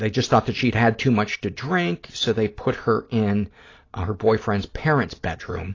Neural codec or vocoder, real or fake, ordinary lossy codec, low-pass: none; real; AAC, 32 kbps; 7.2 kHz